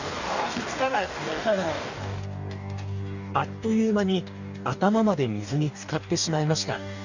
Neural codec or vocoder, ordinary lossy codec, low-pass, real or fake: codec, 44.1 kHz, 2.6 kbps, DAC; none; 7.2 kHz; fake